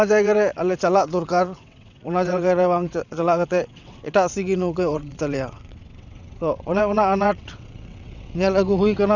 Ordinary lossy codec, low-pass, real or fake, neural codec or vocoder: none; 7.2 kHz; fake; vocoder, 22.05 kHz, 80 mel bands, WaveNeXt